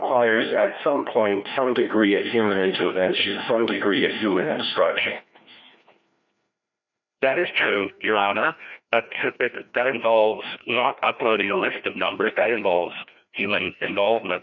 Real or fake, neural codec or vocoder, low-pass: fake; codec, 16 kHz, 1 kbps, FreqCodec, larger model; 7.2 kHz